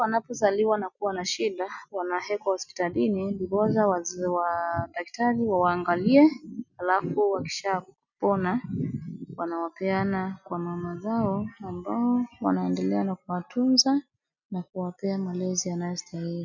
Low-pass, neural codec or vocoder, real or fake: 7.2 kHz; none; real